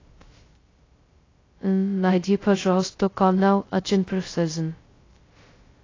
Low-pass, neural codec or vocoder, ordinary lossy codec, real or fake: 7.2 kHz; codec, 16 kHz, 0.2 kbps, FocalCodec; AAC, 32 kbps; fake